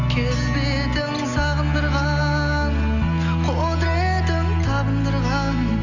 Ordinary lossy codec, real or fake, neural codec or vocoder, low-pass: none; real; none; 7.2 kHz